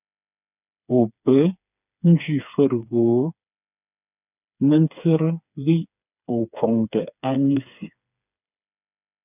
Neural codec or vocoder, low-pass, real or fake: codec, 16 kHz, 4 kbps, FreqCodec, smaller model; 3.6 kHz; fake